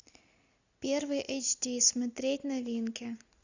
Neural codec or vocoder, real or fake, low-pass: none; real; 7.2 kHz